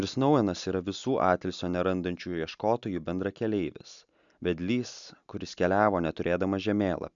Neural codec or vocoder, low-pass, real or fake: none; 7.2 kHz; real